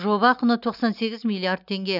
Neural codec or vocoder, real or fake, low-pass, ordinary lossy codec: none; real; 5.4 kHz; none